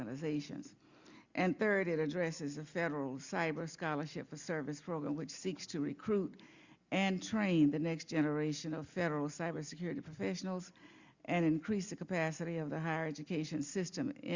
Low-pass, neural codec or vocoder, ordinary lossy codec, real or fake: 7.2 kHz; none; Opus, 64 kbps; real